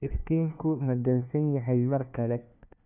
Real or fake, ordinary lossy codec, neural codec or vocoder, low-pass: fake; none; codec, 16 kHz, 1 kbps, FunCodec, trained on LibriTTS, 50 frames a second; 3.6 kHz